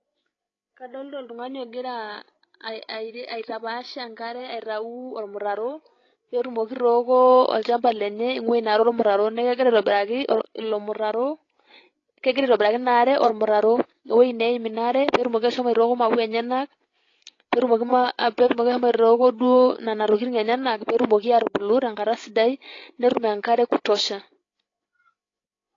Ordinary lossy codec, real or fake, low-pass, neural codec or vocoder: AAC, 32 kbps; fake; 7.2 kHz; codec, 16 kHz, 16 kbps, FreqCodec, larger model